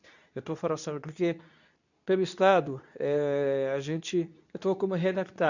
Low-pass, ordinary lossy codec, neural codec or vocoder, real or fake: 7.2 kHz; none; codec, 24 kHz, 0.9 kbps, WavTokenizer, medium speech release version 2; fake